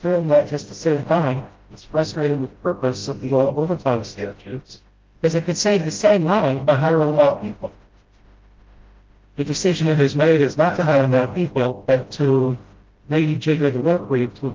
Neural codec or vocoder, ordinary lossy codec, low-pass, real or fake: codec, 16 kHz, 0.5 kbps, FreqCodec, smaller model; Opus, 32 kbps; 7.2 kHz; fake